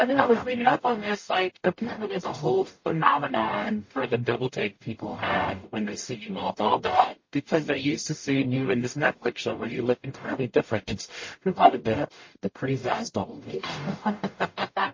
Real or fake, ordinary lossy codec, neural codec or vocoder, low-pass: fake; MP3, 32 kbps; codec, 44.1 kHz, 0.9 kbps, DAC; 7.2 kHz